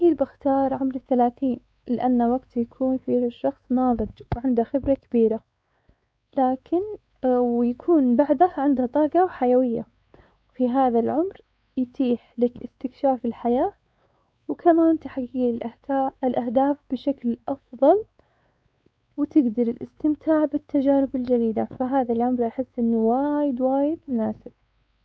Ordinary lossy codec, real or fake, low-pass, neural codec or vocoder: none; fake; none; codec, 16 kHz, 4 kbps, X-Codec, WavLM features, trained on Multilingual LibriSpeech